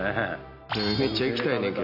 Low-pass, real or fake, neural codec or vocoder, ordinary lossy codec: 5.4 kHz; real; none; none